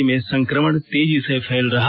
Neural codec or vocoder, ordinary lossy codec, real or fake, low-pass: none; AAC, 32 kbps; real; 5.4 kHz